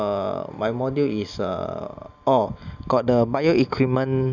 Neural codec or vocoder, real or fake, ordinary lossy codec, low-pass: none; real; none; 7.2 kHz